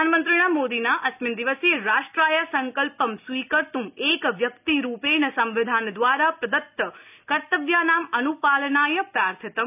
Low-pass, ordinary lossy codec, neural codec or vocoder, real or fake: 3.6 kHz; none; none; real